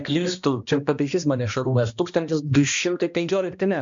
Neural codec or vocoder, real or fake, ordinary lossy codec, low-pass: codec, 16 kHz, 1 kbps, X-Codec, HuBERT features, trained on balanced general audio; fake; MP3, 64 kbps; 7.2 kHz